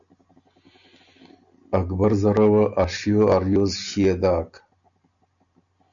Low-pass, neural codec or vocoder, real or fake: 7.2 kHz; none; real